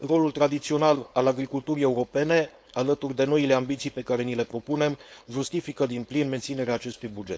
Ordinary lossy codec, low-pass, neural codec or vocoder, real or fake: none; none; codec, 16 kHz, 4.8 kbps, FACodec; fake